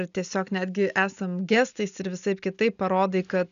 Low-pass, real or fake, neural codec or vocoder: 7.2 kHz; real; none